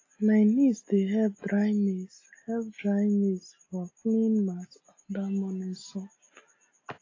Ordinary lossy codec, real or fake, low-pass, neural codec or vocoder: AAC, 32 kbps; real; 7.2 kHz; none